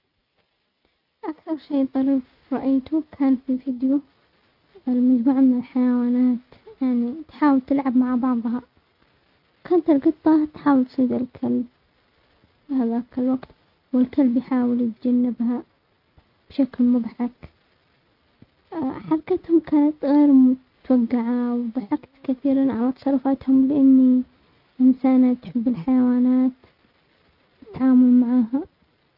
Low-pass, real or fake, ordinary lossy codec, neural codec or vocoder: 5.4 kHz; real; none; none